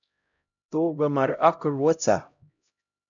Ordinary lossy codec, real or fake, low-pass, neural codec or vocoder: MP3, 64 kbps; fake; 7.2 kHz; codec, 16 kHz, 0.5 kbps, X-Codec, HuBERT features, trained on LibriSpeech